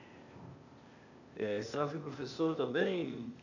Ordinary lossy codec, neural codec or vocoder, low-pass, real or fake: none; codec, 16 kHz, 0.8 kbps, ZipCodec; 7.2 kHz; fake